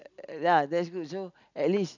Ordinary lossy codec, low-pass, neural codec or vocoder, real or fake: none; 7.2 kHz; none; real